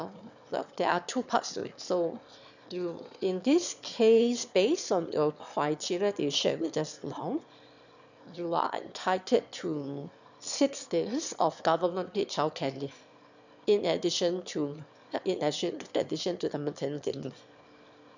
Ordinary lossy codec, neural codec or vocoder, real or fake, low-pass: none; autoencoder, 22.05 kHz, a latent of 192 numbers a frame, VITS, trained on one speaker; fake; 7.2 kHz